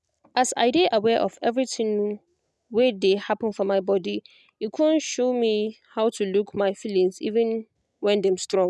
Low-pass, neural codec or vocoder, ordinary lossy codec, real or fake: none; none; none; real